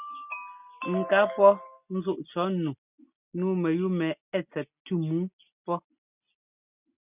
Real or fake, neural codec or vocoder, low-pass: real; none; 3.6 kHz